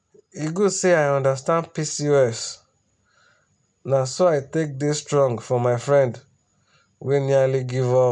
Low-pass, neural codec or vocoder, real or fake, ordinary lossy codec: 10.8 kHz; none; real; none